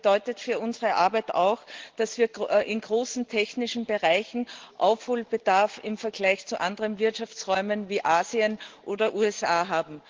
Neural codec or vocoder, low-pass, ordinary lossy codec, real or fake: none; 7.2 kHz; Opus, 16 kbps; real